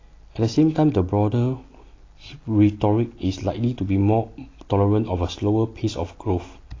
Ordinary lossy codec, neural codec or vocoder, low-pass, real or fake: AAC, 32 kbps; none; 7.2 kHz; real